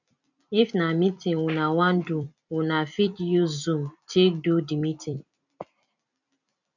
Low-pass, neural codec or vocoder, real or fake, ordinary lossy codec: 7.2 kHz; vocoder, 44.1 kHz, 128 mel bands every 256 samples, BigVGAN v2; fake; none